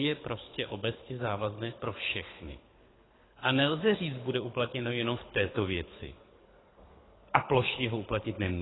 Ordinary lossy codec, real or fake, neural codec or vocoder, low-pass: AAC, 16 kbps; fake; codec, 24 kHz, 6 kbps, HILCodec; 7.2 kHz